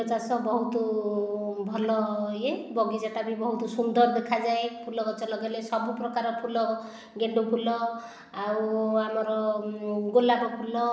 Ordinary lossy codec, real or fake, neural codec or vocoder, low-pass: none; real; none; none